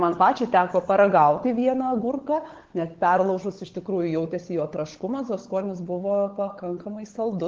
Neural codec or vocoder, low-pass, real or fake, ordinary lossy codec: codec, 16 kHz, 16 kbps, FunCodec, trained on LibriTTS, 50 frames a second; 7.2 kHz; fake; Opus, 16 kbps